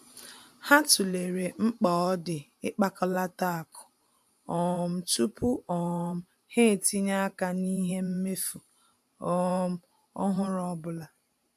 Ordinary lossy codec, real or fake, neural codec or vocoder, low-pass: AAC, 96 kbps; fake; vocoder, 44.1 kHz, 128 mel bands every 512 samples, BigVGAN v2; 14.4 kHz